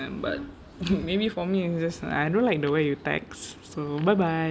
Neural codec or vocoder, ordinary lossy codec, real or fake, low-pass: none; none; real; none